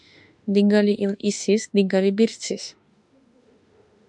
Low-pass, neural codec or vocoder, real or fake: 10.8 kHz; autoencoder, 48 kHz, 32 numbers a frame, DAC-VAE, trained on Japanese speech; fake